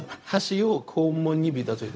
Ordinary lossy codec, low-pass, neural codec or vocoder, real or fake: none; none; codec, 16 kHz, 0.4 kbps, LongCat-Audio-Codec; fake